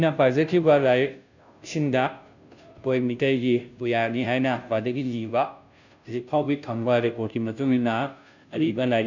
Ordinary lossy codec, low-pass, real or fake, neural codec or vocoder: none; 7.2 kHz; fake; codec, 16 kHz, 0.5 kbps, FunCodec, trained on Chinese and English, 25 frames a second